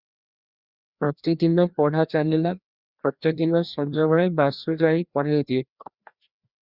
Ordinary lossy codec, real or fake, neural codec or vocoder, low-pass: Opus, 64 kbps; fake; codec, 16 kHz, 1 kbps, FreqCodec, larger model; 5.4 kHz